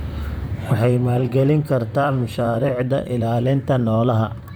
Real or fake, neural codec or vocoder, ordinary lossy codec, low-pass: fake; vocoder, 44.1 kHz, 128 mel bands, Pupu-Vocoder; none; none